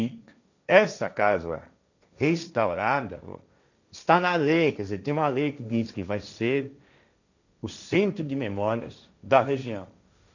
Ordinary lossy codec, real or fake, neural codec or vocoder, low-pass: none; fake; codec, 16 kHz, 1.1 kbps, Voila-Tokenizer; 7.2 kHz